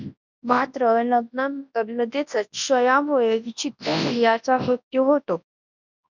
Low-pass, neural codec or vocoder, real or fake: 7.2 kHz; codec, 24 kHz, 0.9 kbps, WavTokenizer, large speech release; fake